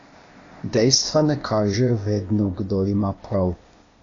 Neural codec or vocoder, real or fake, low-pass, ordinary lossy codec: codec, 16 kHz, 0.8 kbps, ZipCodec; fake; 7.2 kHz; AAC, 32 kbps